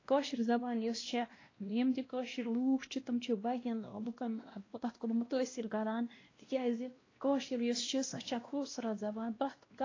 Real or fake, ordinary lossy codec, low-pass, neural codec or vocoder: fake; AAC, 32 kbps; 7.2 kHz; codec, 16 kHz, 1 kbps, X-Codec, WavLM features, trained on Multilingual LibriSpeech